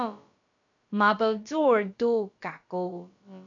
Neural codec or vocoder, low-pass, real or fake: codec, 16 kHz, about 1 kbps, DyCAST, with the encoder's durations; 7.2 kHz; fake